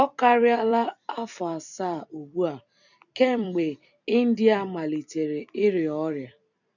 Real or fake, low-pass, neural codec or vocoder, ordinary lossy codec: fake; 7.2 kHz; vocoder, 44.1 kHz, 128 mel bands every 256 samples, BigVGAN v2; none